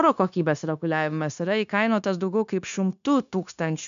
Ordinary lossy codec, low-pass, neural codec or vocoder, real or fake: MP3, 96 kbps; 7.2 kHz; codec, 16 kHz, 0.9 kbps, LongCat-Audio-Codec; fake